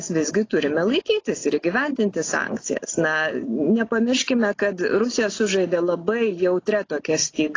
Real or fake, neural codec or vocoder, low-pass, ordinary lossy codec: real; none; 7.2 kHz; AAC, 32 kbps